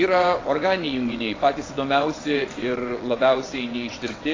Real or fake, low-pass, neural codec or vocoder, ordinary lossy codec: fake; 7.2 kHz; vocoder, 22.05 kHz, 80 mel bands, WaveNeXt; AAC, 32 kbps